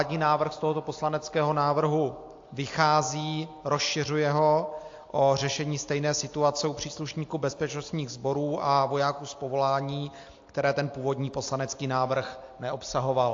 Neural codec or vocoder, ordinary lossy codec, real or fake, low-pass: none; AAC, 48 kbps; real; 7.2 kHz